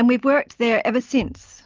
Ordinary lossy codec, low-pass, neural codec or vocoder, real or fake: Opus, 32 kbps; 7.2 kHz; none; real